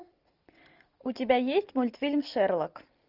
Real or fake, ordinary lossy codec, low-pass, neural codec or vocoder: real; Opus, 24 kbps; 5.4 kHz; none